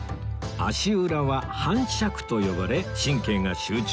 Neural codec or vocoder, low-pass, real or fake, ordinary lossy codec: none; none; real; none